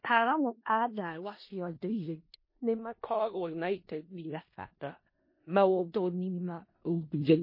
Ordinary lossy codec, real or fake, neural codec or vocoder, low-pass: MP3, 24 kbps; fake; codec, 16 kHz in and 24 kHz out, 0.4 kbps, LongCat-Audio-Codec, four codebook decoder; 5.4 kHz